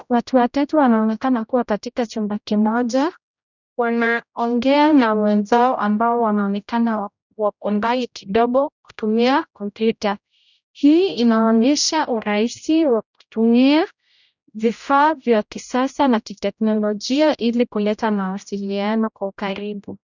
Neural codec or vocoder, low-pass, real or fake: codec, 16 kHz, 0.5 kbps, X-Codec, HuBERT features, trained on general audio; 7.2 kHz; fake